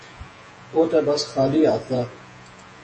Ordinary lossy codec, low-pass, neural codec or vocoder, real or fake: MP3, 32 kbps; 10.8 kHz; vocoder, 48 kHz, 128 mel bands, Vocos; fake